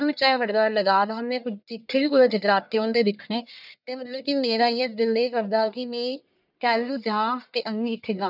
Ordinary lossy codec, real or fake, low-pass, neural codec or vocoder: none; fake; 5.4 kHz; codec, 44.1 kHz, 1.7 kbps, Pupu-Codec